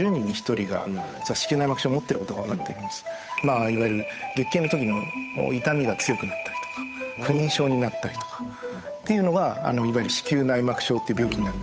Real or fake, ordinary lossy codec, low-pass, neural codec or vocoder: fake; none; none; codec, 16 kHz, 8 kbps, FunCodec, trained on Chinese and English, 25 frames a second